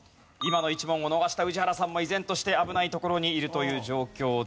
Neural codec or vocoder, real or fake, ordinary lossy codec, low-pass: none; real; none; none